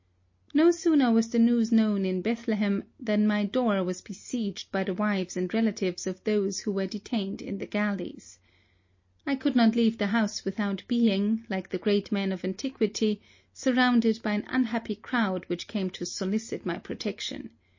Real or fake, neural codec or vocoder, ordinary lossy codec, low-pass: real; none; MP3, 32 kbps; 7.2 kHz